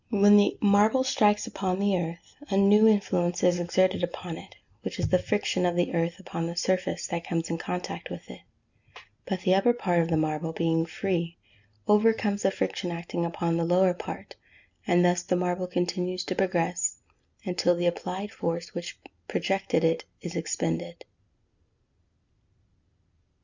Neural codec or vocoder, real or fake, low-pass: none; real; 7.2 kHz